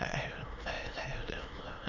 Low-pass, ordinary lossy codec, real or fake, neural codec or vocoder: 7.2 kHz; none; fake; autoencoder, 22.05 kHz, a latent of 192 numbers a frame, VITS, trained on many speakers